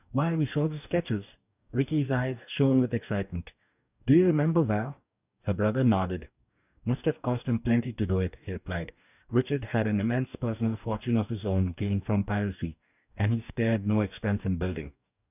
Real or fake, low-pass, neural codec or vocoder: fake; 3.6 kHz; codec, 44.1 kHz, 2.6 kbps, DAC